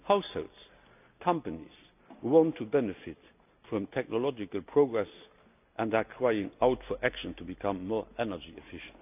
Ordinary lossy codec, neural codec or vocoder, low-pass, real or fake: none; none; 3.6 kHz; real